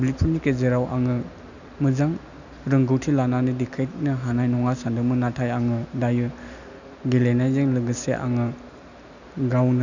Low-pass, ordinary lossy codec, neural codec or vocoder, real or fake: 7.2 kHz; none; none; real